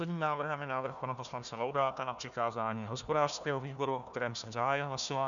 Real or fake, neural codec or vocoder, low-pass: fake; codec, 16 kHz, 1 kbps, FunCodec, trained on Chinese and English, 50 frames a second; 7.2 kHz